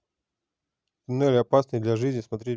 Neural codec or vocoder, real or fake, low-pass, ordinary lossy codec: none; real; none; none